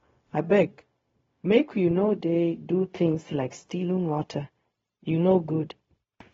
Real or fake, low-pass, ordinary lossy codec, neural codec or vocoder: fake; 7.2 kHz; AAC, 24 kbps; codec, 16 kHz, 0.4 kbps, LongCat-Audio-Codec